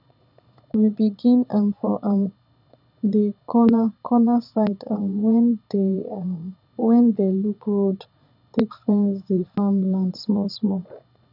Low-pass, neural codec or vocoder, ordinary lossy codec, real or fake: 5.4 kHz; vocoder, 22.05 kHz, 80 mel bands, Vocos; none; fake